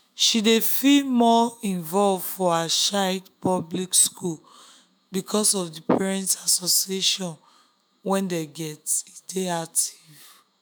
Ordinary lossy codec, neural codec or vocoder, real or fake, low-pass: none; autoencoder, 48 kHz, 128 numbers a frame, DAC-VAE, trained on Japanese speech; fake; none